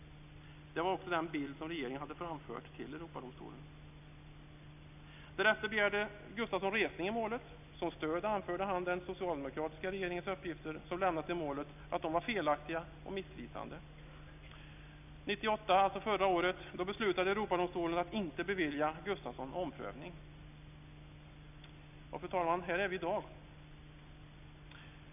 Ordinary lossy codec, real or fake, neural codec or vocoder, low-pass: none; real; none; 3.6 kHz